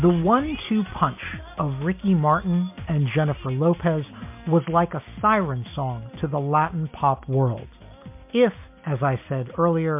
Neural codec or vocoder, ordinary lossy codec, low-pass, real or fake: none; MP3, 32 kbps; 3.6 kHz; real